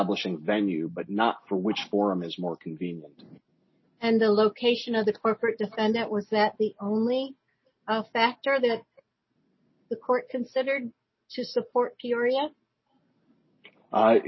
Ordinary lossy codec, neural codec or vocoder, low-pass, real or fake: MP3, 24 kbps; none; 7.2 kHz; real